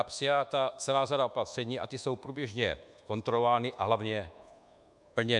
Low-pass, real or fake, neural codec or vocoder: 10.8 kHz; fake; codec, 24 kHz, 1.2 kbps, DualCodec